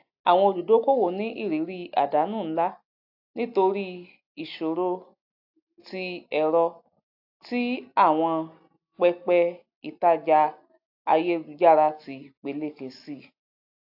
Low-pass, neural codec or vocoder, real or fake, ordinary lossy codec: 5.4 kHz; none; real; AAC, 48 kbps